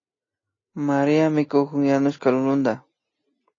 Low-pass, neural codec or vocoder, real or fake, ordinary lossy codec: 7.2 kHz; none; real; AAC, 32 kbps